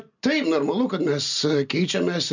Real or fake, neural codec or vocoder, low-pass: real; none; 7.2 kHz